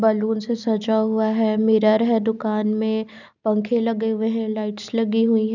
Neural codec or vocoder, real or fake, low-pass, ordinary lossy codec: none; real; 7.2 kHz; none